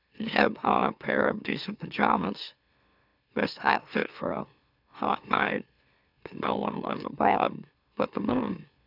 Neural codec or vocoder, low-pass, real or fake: autoencoder, 44.1 kHz, a latent of 192 numbers a frame, MeloTTS; 5.4 kHz; fake